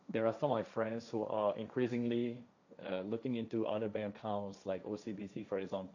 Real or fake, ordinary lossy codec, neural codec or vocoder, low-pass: fake; none; codec, 16 kHz, 1.1 kbps, Voila-Tokenizer; 7.2 kHz